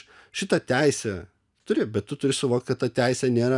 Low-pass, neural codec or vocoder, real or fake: 10.8 kHz; none; real